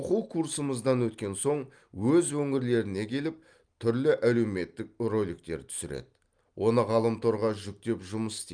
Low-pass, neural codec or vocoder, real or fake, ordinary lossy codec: 9.9 kHz; none; real; Opus, 32 kbps